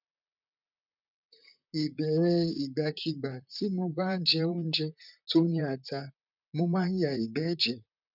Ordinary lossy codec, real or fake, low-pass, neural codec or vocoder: none; fake; 5.4 kHz; vocoder, 44.1 kHz, 128 mel bands, Pupu-Vocoder